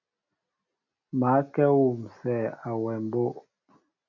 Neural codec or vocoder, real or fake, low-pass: none; real; 7.2 kHz